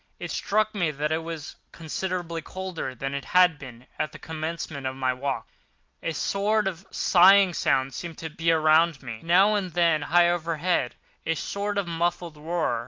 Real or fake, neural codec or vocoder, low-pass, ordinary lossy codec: real; none; 7.2 kHz; Opus, 32 kbps